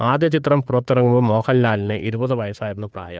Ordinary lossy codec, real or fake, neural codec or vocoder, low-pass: none; fake; codec, 16 kHz, 2 kbps, FunCodec, trained on Chinese and English, 25 frames a second; none